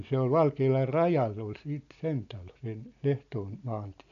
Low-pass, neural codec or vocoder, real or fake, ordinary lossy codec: 7.2 kHz; none; real; none